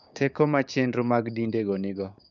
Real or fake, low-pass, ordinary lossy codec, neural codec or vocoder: fake; 7.2 kHz; none; codec, 16 kHz, 6 kbps, DAC